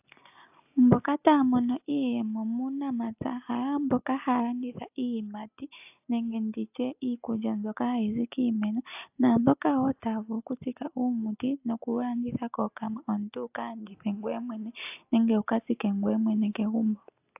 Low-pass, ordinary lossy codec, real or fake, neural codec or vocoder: 3.6 kHz; AAC, 32 kbps; real; none